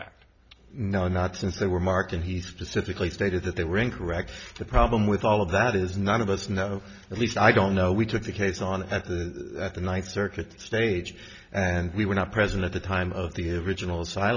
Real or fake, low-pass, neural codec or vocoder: real; 7.2 kHz; none